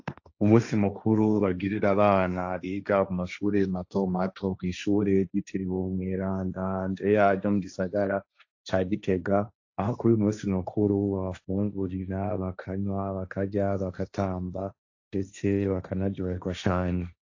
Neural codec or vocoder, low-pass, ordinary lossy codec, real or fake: codec, 16 kHz, 1.1 kbps, Voila-Tokenizer; 7.2 kHz; AAC, 48 kbps; fake